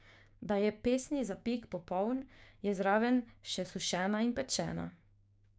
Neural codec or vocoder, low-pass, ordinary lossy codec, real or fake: codec, 16 kHz, 6 kbps, DAC; none; none; fake